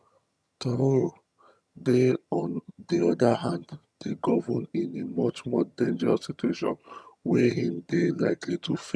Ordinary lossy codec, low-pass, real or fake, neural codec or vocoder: none; none; fake; vocoder, 22.05 kHz, 80 mel bands, HiFi-GAN